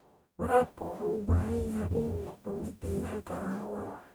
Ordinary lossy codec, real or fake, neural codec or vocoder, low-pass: none; fake; codec, 44.1 kHz, 0.9 kbps, DAC; none